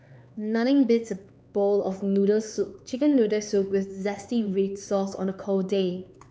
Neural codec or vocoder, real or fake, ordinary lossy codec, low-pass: codec, 16 kHz, 4 kbps, X-Codec, HuBERT features, trained on LibriSpeech; fake; none; none